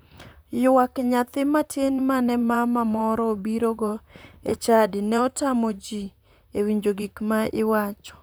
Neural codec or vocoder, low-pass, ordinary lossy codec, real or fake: vocoder, 44.1 kHz, 128 mel bands, Pupu-Vocoder; none; none; fake